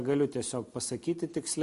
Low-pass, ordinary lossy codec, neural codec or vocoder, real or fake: 14.4 kHz; MP3, 48 kbps; none; real